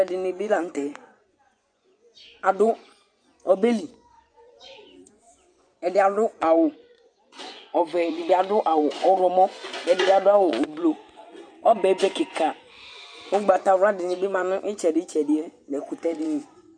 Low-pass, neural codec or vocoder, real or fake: 9.9 kHz; vocoder, 24 kHz, 100 mel bands, Vocos; fake